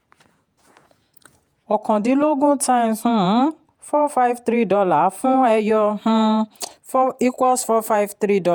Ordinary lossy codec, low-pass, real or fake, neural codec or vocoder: none; none; fake; vocoder, 48 kHz, 128 mel bands, Vocos